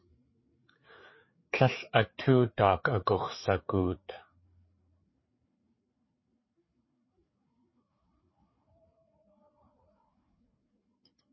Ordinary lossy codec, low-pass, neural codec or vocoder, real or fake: MP3, 24 kbps; 7.2 kHz; codec, 16 kHz, 4 kbps, FreqCodec, larger model; fake